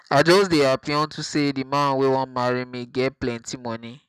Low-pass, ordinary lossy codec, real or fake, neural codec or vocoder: 14.4 kHz; none; real; none